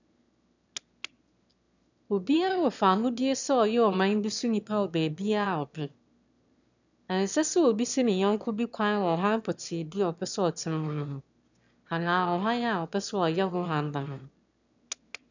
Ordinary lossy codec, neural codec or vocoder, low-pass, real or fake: none; autoencoder, 22.05 kHz, a latent of 192 numbers a frame, VITS, trained on one speaker; 7.2 kHz; fake